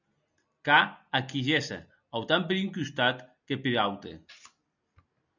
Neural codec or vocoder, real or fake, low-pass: none; real; 7.2 kHz